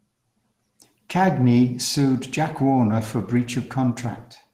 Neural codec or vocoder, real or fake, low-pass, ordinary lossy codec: none; real; 14.4 kHz; Opus, 16 kbps